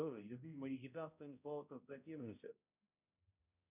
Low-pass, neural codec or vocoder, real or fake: 3.6 kHz; codec, 16 kHz, 0.5 kbps, X-Codec, HuBERT features, trained on balanced general audio; fake